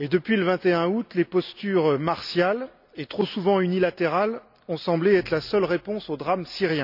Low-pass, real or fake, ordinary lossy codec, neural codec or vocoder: 5.4 kHz; real; none; none